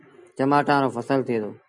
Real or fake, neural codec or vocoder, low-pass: real; none; 10.8 kHz